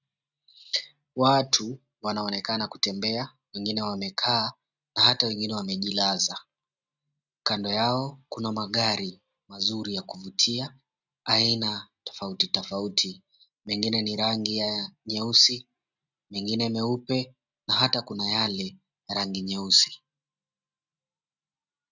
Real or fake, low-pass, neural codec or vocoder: real; 7.2 kHz; none